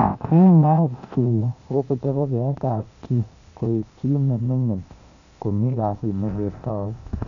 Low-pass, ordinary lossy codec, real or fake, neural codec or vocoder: 7.2 kHz; none; fake; codec, 16 kHz, 0.8 kbps, ZipCodec